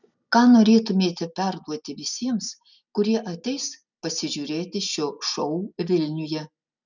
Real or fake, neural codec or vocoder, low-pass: real; none; 7.2 kHz